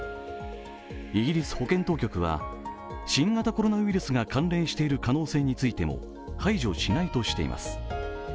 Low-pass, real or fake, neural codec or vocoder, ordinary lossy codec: none; real; none; none